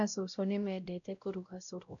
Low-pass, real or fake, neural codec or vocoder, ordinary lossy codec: 7.2 kHz; fake; codec, 16 kHz, 0.5 kbps, X-Codec, WavLM features, trained on Multilingual LibriSpeech; none